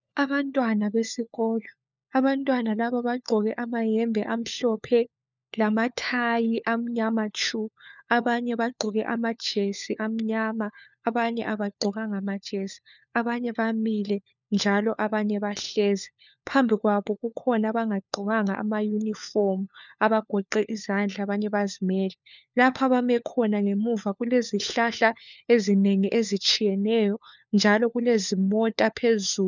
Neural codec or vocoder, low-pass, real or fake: codec, 16 kHz, 4 kbps, FunCodec, trained on LibriTTS, 50 frames a second; 7.2 kHz; fake